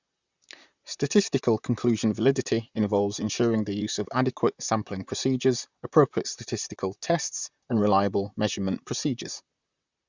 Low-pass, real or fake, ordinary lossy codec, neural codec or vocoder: 7.2 kHz; fake; Opus, 64 kbps; vocoder, 44.1 kHz, 128 mel bands, Pupu-Vocoder